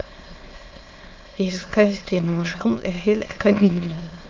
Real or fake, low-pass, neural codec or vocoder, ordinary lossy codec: fake; 7.2 kHz; autoencoder, 22.05 kHz, a latent of 192 numbers a frame, VITS, trained on many speakers; Opus, 24 kbps